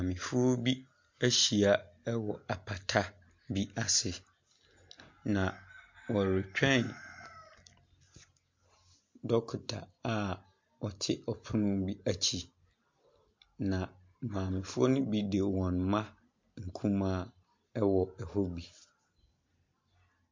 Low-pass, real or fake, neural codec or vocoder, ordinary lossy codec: 7.2 kHz; real; none; MP3, 48 kbps